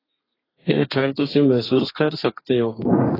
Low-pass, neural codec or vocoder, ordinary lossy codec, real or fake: 5.4 kHz; codec, 32 kHz, 1.9 kbps, SNAC; AAC, 24 kbps; fake